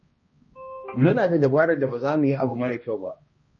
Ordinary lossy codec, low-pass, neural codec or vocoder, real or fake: MP3, 32 kbps; 7.2 kHz; codec, 16 kHz, 1 kbps, X-Codec, HuBERT features, trained on balanced general audio; fake